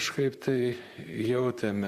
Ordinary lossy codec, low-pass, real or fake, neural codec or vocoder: Opus, 64 kbps; 14.4 kHz; fake; vocoder, 44.1 kHz, 128 mel bands, Pupu-Vocoder